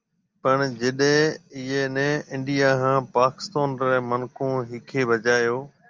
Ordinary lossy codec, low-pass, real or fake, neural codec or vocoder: Opus, 32 kbps; 7.2 kHz; real; none